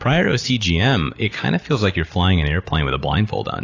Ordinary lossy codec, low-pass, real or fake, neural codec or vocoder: AAC, 32 kbps; 7.2 kHz; real; none